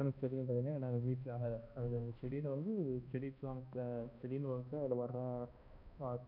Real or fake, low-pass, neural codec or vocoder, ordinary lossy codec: fake; 5.4 kHz; codec, 16 kHz, 1 kbps, X-Codec, HuBERT features, trained on balanced general audio; none